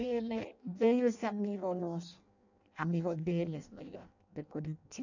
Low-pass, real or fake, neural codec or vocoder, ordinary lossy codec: 7.2 kHz; fake; codec, 16 kHz in and 24 kHz out, 0.6 kbps, FireRedTTS-2 codec; none